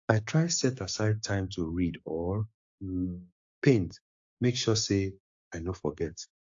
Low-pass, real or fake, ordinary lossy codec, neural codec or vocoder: 7.2 kHz; fake; AAC, 48 kbps; codec, 16 kHz, 6 kbps, DAC